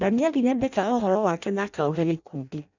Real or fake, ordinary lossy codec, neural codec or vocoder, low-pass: fake; none; codec, 16 kHz in and 24 kHz out, 0.6 kbps, FireRedTTS-2 codec; 7.2 kHz